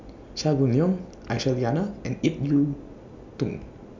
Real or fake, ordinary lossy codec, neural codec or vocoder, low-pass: real; MP3, 64 kbps; none; 7.2 kHz